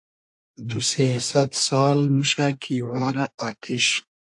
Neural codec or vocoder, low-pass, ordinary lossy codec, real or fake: codec, 24 kHz, 1 kbps, SNAC; 10.8 kHz; AAC, 64 kbps; fake